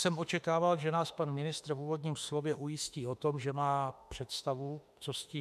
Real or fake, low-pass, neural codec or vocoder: fake; 14.4 kHz; autoencoder, 48 kHz, 32 numbers a frame, DAC-VAE, trained on Japanese speech